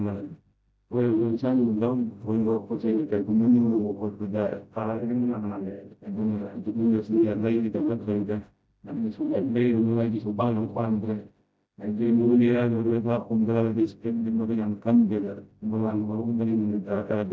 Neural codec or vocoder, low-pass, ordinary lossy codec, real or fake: codec, 16 kHz, 0.5 kbps, FreqCodec, smaller model; none; none; fake